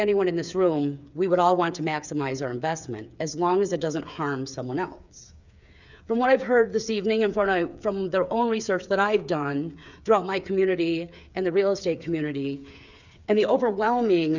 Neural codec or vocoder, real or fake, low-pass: codec, 16 kHz, 8 kbps, FreqCodec, smaller model; fake; 7.2 kHz